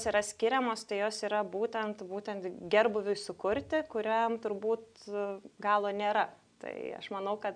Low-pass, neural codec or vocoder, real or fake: 9.9 kHz; none; real